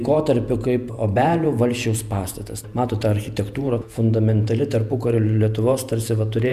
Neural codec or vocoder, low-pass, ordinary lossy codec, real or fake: vocoder, 48 kHz, 128 mel bands, Vocos; 14.4 kHz; MP3, 96 kbps; fake